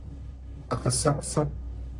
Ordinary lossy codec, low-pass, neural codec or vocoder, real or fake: MP3, 96 kbps; 10.8 kHz; codec, 44.1 kHz, 1.7 kbps, Pupu-Codec; fake